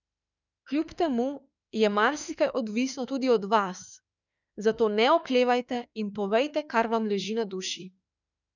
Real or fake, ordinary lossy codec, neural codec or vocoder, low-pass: fake; none; autoencoder, 48 kHz, 32 numbers a frame, DAC-VAE, trained on Japanese speech; 7.2 kHz